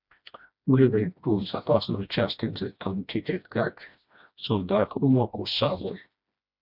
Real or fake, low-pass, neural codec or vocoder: fake; 5.4 kHz; codec, 16 kHz, 1 kbps, FreqCodec, smaller model